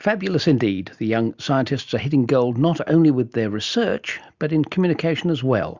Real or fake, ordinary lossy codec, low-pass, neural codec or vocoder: real; Opus, 64 kbps; 7.2 kHz; none